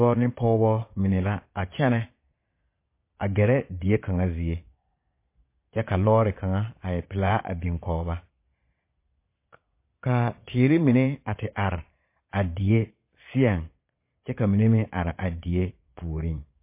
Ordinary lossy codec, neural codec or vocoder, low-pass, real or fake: MP3, 24 kbps; vocoder, 22.05 kHz, 80 mel bands, Vocos; 3.6 kHz; fake